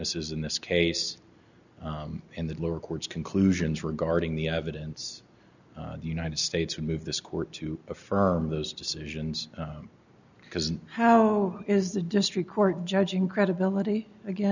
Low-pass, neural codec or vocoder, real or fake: 7.2 kHz; none; real